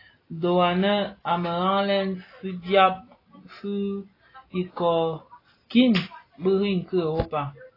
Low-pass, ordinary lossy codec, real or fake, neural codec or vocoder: 5.4 kHz; AAC, 24 kbps; real; none